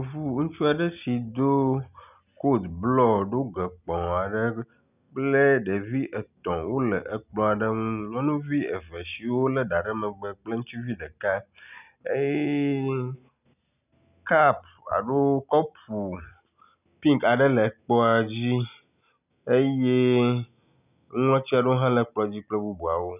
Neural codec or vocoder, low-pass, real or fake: none; 3.6 kHz; real